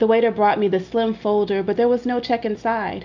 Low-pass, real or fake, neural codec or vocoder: 7.2 kHz; real; none